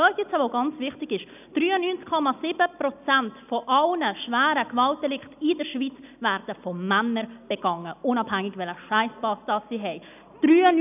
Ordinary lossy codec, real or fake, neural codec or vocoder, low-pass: none; real; none; 3.6 kHz